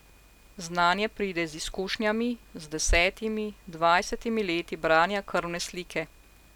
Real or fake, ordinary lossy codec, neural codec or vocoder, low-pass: real; none; none; 19.8 kHz